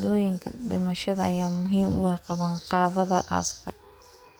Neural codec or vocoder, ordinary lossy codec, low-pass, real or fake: codec, 44.1 kHz, 2.6 kbps, SNAC; none; none; fake